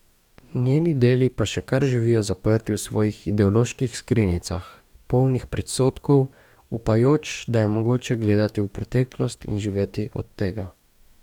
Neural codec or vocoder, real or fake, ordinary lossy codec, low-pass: codec, 44.1 kHz, 2.6 kbps, DAC; fake; none; 19.8 kHz